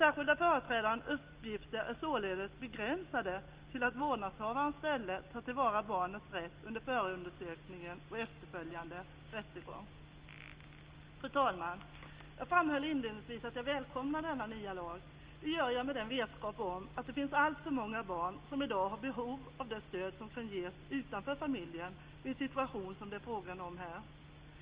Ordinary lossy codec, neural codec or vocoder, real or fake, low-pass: Opus, 32 kbps; none; real; 3.6 kHz